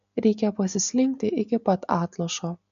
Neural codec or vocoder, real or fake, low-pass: none; real; 7.2 kHz